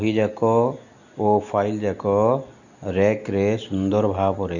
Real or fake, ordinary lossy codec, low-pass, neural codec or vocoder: real; none; 7.2 kHz; none